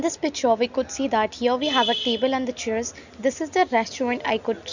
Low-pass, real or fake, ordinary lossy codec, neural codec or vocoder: 7.2 kHz; real; none; none